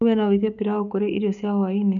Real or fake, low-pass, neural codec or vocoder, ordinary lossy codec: fake; 7.2 kHz; codec, 16 kHz, 6 kbps, DAC; none